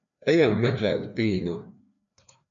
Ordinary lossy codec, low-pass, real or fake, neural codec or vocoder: MP3, 96 kbps; 7.2 kHz; fake; codec, 16 kHz, 2 kbps, FreqCodec, larger model